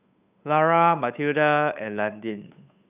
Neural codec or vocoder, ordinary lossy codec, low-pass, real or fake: codec, 16 kHz, 8 kbps, FunCodec, trained on Chinese and English, 25 frames a second; none; 3.6 kHz; fake